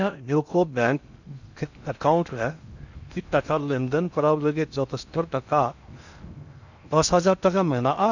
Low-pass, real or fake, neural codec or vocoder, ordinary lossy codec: 7.2 kHz; fake; codec, 16 kHz in and 24 kHz out, 0.6 kbps, FocalCodec, streaming, 2048 codes; none